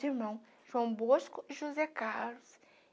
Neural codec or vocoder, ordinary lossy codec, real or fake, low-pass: none; none; real; none